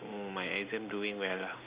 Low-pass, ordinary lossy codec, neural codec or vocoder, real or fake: 3.6 kHz; Opus, 64 kbps; none; real